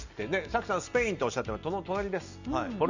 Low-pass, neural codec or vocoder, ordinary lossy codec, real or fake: 7.2 kHz; none; none; real